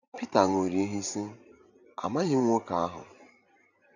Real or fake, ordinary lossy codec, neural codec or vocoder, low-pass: fake; none; vocoder, 44.1 kHz, 128 mel bands every 512 samples, BigVGAN v2; 7.2 kHz